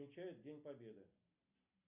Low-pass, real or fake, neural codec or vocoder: 3.6 kHz; real; none